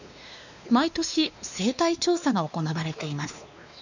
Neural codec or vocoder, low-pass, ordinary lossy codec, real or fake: codec, 16 kHz, 2 kbps, X-Codec, WavLM features, trained on Multilingual LibriSpeech; 7.2 kHz; none; fake